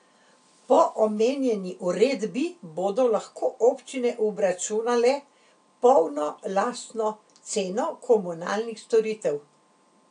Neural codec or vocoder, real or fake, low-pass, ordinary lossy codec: none; real; 9.9 kHz; AAC, 64 kbps